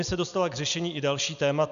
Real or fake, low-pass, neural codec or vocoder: real; 7.2 kHz; none